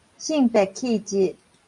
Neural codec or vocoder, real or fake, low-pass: none; real; 10.8 kHz